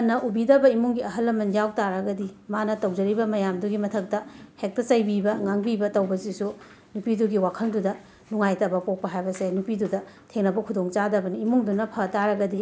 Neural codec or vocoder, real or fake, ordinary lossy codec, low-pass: none; real; none; none